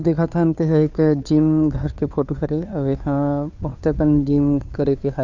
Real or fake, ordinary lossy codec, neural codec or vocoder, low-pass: fake; none; codec, 16 kHz, 2 kbps, FunCodec, trained on Chinese and English, 25 frames a second; 7.2 kHz